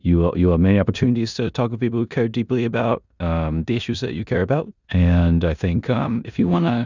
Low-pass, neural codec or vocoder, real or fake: 7.2 kHz; codec, 16 kHz in and 24 kHz out, 0.9 kbps, LongCat-Audio-Codec, four codebook decoder; fake